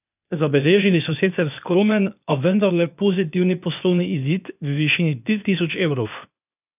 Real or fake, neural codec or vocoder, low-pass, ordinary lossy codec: fake; codec, 16 kHz, 0.8 kbps, ZipCodec; 3.6 kHz; none